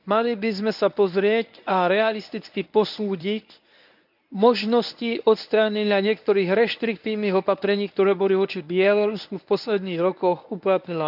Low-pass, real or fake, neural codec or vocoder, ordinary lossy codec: 5.4 kHz; fake; codec, 24 kHz, 0.9 kbps, WavTokenizer, medium speech release version 1; none